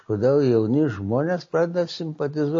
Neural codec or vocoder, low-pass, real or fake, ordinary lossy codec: none; 7.2 kHz; real; MP3, 32 kbps